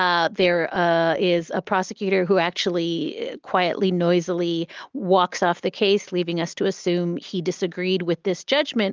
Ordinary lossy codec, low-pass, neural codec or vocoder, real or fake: Opus, 24 kbps; 7.2 kHz; none; real